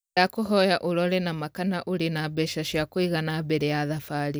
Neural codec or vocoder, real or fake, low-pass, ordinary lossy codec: none; real; none; none